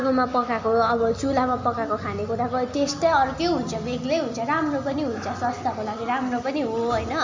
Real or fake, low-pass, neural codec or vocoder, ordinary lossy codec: real; 7.2 kHz; none; MP3, 64 kbps